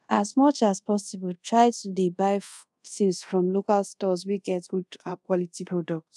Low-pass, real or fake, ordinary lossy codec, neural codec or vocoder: none; fake; none; codec, 24 kHz, 0.5 kbps, DualCodec